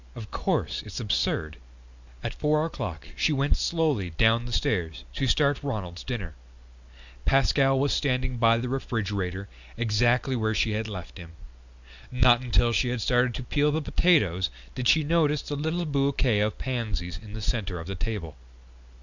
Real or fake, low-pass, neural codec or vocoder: real; 7.2 kHz; none